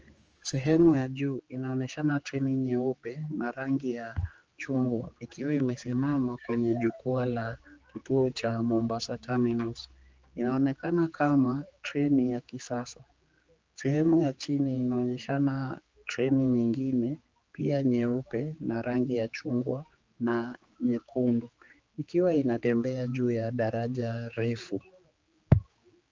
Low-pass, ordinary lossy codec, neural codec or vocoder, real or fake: 7.2 kHz; Opus, 24 kbps; codec, 16 kHz, 4 kbps, X-Codec, HuBERT features, trained on general audio; fake